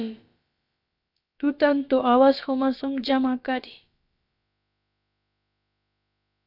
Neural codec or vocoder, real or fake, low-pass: codec, 16 kHz, about 1 kbps, DyCAST, with the encoder's durations; fake; 5.4 kHz